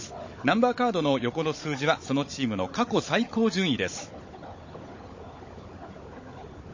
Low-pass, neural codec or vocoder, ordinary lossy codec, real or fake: 7.2 kHz; codec, 16 kHz, 16 kbps, FunCodec, trained on Chinese and English, 50 frames a second; MP3, 32 kbps; fake